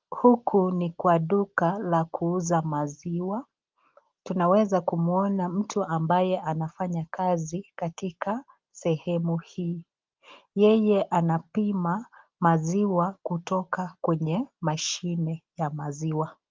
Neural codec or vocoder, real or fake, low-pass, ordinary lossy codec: none; real; 7.2 kHz; Opus, 32 kbps